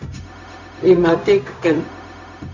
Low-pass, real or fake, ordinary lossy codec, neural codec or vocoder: 7.2 kHz; fake; Opus, 64 kbps; codec, 16 kHz, 0.4 kbps, LongCat-Audio-Codec